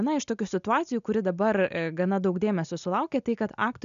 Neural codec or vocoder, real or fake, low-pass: none; real; 7.2 kHz